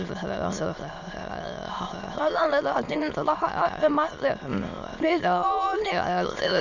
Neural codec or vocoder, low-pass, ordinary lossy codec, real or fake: autoencoder, 22.05 kHz, a latent of 192 numbers a frame, VITS, trained on many speakers; 7.2 kHz; none; fake